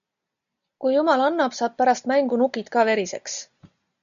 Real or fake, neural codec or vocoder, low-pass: real; none; 7.2 kHz